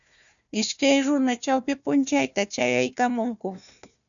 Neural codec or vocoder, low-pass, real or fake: codec, 16 kHz, 1 kbps, FunCodec, trained on Chinese and English, 50 frames a second; 7.2 kHz; fake